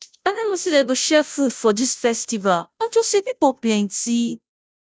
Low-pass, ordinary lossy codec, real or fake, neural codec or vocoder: none; none; fake; codec, 16 kHz, 0.5 kbps, FunCodec, trained on Chinese and English, 25 frames a second